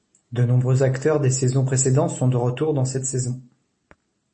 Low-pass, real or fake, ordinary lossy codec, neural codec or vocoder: 10.8 kHz; real; MP3, 32 kbps; none